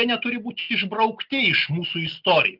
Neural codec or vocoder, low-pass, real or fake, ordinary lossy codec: none; 5.4 kHz; real; Opus, 32 kbps